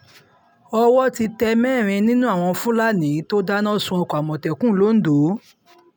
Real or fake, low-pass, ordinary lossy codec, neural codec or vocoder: real; none; none; none